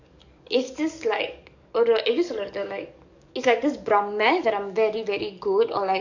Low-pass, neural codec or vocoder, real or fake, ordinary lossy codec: 7.2 kHz; codec, 44.1 kHz, 7.8 kbps, DAC; fake; none